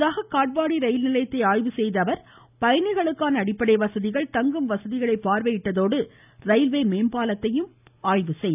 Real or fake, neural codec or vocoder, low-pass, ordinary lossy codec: real; none; 3.6 kHz; none